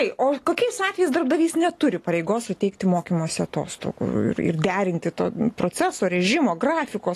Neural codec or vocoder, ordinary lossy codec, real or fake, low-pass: none; AAC, 48 kbps; real; 14.4 kHz